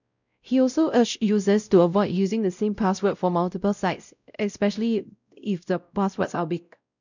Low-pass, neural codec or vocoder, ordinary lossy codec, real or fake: 7.2 kHz; codec, 16 kHz, 0.5 kbps, X-Codec, WavLM features, trained on Multilingual LibriSpeech; none; fake